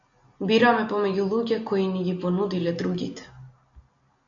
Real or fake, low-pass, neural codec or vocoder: real; 7.2 kHz; none